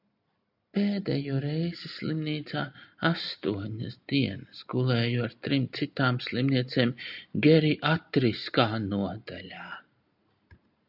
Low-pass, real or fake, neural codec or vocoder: 5.4 kHz; real; none